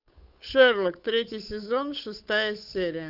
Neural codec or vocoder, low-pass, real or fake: codec, 16 kHz, 8 kbps, FunCodec, trained on Chinese and English, 25 frames a second; 5.4 kHz; fake